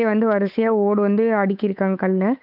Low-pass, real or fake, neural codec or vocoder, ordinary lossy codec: 5.4 kHz; fake; codec, 16 kHz, 8 kbps, FunCodec, trained on Chinese and English, 25 frames a second; none